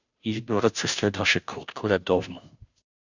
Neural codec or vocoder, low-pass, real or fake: codec, 16 kHz, 0.5 kbps, FunCodec, trained on Chinese and English, 25 frames a second; 7.2 kHz; fake